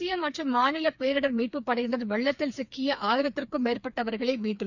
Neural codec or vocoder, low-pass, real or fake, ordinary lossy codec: codec, 16 kHz, 4 kbps, FreqCodec, smaller model; 7.2 kHz; fake; none